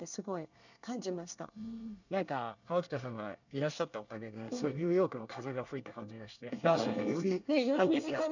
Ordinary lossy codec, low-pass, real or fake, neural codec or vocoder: none; 7.2 kHz; fake; codec, 24 kHz, 1 kbps, SNAC